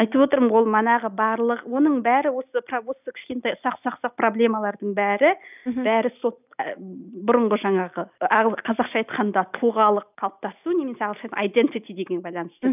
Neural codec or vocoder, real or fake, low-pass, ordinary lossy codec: none; real; 3.6 kHz; none